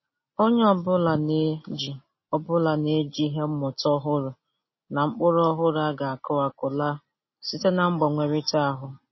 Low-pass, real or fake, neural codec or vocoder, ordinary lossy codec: 7.2 kHz; real; none; MP3, 24 kbps